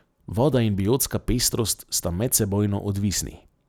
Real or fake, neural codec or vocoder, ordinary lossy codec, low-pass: real; none; none; none